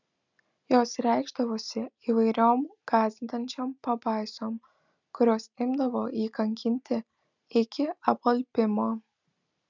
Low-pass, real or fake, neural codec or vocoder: 7.2 kHz; real; none